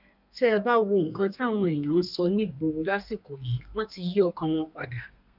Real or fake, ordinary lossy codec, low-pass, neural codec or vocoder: fake; none; 5.4 kHz; codec, 32 kHz, 1.9 kbps, SNAC